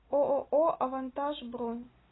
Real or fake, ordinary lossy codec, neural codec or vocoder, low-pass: real; AAC, 16 kbps; none; 7.2 kHz